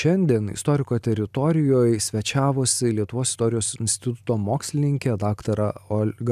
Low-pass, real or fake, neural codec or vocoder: 14.4 kHz; real; none